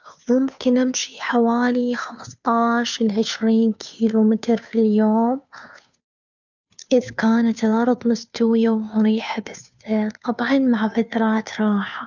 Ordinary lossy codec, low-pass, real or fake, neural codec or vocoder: none; 7.2 kHz; fake; codec, 16 kHz, 2 kbps, FunCodec, trained on Chinese and English, 25 frames a second